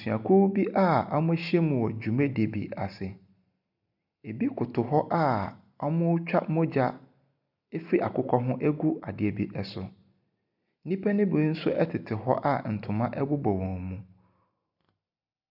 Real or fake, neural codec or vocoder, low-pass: real; none; 5.4 kHz